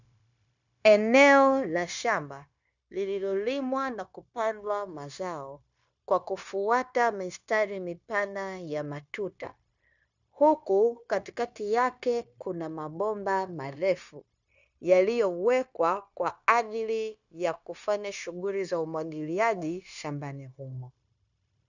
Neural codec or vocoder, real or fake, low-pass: codec, 16 kHz, 0.9 kbps, LongCat-Audio-Codec; fake; 7.2 kHz